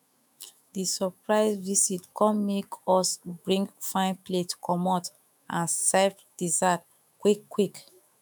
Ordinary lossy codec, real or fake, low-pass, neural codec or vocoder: none; fake; none; autoencoder, 48 kHz, 128 numbers a frame, DAC-VAE, trained on Japanese speech